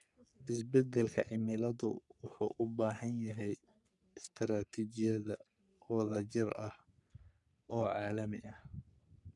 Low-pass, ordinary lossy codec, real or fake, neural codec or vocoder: 10.8 kHz; none; fake; codec, 44.1 kHz, 3.4 kbps, Pupu-Codec